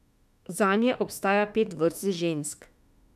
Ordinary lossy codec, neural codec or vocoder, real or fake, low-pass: none; autoencoder, 48 kHz, 32 numbers a frame, DAC-VAE, trained on Japanese speech; fake; 14.4 kHz